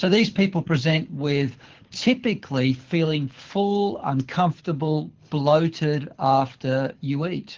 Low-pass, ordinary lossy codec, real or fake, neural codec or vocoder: 7.2 kHz; Opus, 16 kbps; fake; codec, 24 kHz, 6 kbps, HILCodec